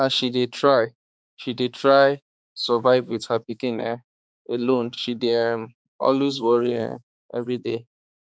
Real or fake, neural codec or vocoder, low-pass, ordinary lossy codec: fake; codec, 16 kHz, 4 kbps, X-Codec, HuBERT features, trained on balanced general audio; none; none